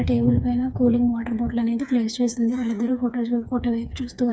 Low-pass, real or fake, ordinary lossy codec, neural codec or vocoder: none; fake; none; codec, 16 kHz, 4 kbps, FreqCodec, smaller model